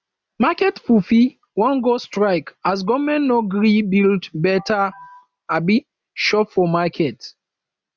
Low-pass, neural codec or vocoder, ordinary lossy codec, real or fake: none; none; none; real